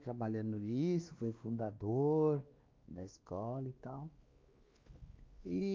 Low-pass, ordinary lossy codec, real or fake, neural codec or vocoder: 7.2 kHz; Opus, 32 kbps; fake; codec, 16 kHz, 2 kbps, X-Codec, WavLM features, trained on Multilingual LibriSpeech